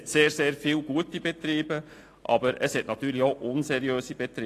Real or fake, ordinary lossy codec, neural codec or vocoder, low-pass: real; AAC, 48 kbps; none; 14.4 kHz